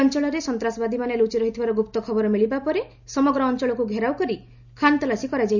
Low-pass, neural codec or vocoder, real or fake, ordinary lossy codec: 7.2 kHz; none; real; none